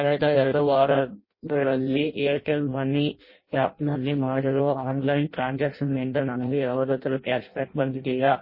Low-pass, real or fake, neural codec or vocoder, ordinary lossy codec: 5.4 kHz; fake; codec, 16 kHz in and 24 kHz out, 0.6 kbps, FireRedTTS-2 codec; MP3, 24 kbps